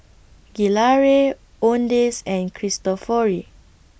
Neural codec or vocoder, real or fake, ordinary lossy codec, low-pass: none; real; none; none